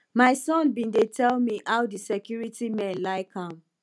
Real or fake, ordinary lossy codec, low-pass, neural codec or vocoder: real; none; none; none